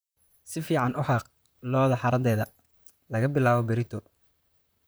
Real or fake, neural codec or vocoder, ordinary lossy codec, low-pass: fake; vocoder, 44.1 kHz, 128 mel bands, Pupu-Vocoder; none; none